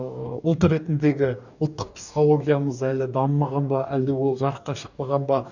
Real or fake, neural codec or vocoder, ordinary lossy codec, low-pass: fake; codec, 44.1 kHz, 2.6 kbps, DAC; none; 7.2 kHz